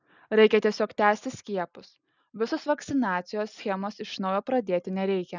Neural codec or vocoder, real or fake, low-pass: none; real; 7.2 kHz